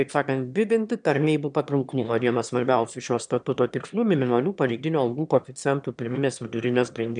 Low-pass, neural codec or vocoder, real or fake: 9.9 kHz; autoencoder, 22.05 kHz, a latent of 192 numbers a frame, VITS, trained on one speaker; fake